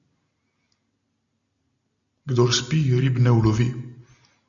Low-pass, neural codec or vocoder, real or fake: 7.2 kHz; none; real